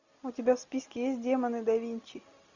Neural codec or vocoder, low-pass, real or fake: none; 7.2 kHz; real